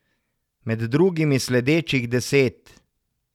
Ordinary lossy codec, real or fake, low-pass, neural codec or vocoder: none; real; 19.8 kHz; none